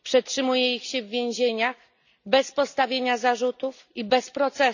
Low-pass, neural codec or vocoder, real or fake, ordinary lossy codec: 7.2 kHz; none; real; none